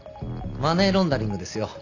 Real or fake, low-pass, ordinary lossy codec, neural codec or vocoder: real; 7.2 kHz; none; none